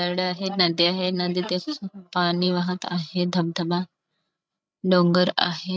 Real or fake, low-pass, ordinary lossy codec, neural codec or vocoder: fake; none; none; codec, 16 kHz, 16 kbps, FreqCodec, larger model